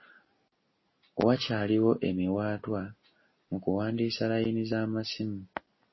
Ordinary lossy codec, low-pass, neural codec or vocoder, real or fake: MP3, 24 kbps; 7.2 kHz; none; real